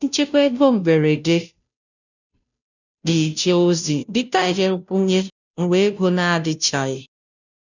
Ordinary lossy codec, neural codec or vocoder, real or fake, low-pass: none; codec, 16 kHz, 0.5 kbps, FunCodec, trained on Chinese and English, 25 frames a second; fake; 7.2 kHz